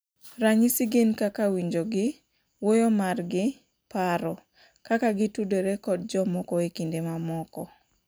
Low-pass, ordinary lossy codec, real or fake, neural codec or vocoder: none; none; real; none